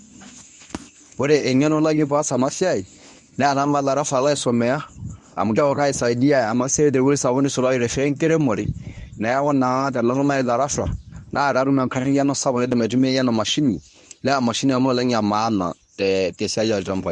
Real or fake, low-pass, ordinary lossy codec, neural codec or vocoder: fake; 10.8 kHz; none; codec, 24 kHz, 0.9 kbps, WavTokenizer, medium speech release version 1